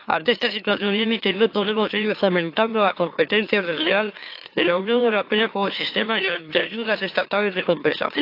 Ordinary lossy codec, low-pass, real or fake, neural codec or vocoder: AAC, 32 kbps; 5.4 kHz; fake; autoencoder, 44.1 kHz, a latent of 192 numbers a frame, MeloTTS